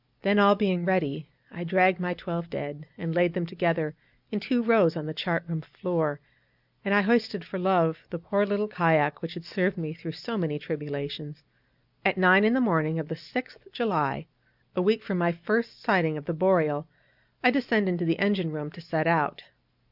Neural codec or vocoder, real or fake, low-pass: none; real; 5.4 kHz